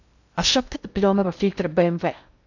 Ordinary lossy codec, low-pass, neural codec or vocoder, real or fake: none; 7.2 kHz; codec, 16 kHz in and 24 kHz out, 0.6 kbps, FocalCodec, streaming, 2048 codes; fake